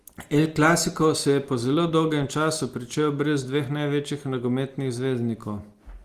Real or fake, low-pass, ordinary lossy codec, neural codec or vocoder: real; 14.4 kHz; Opus, 24 kbps; none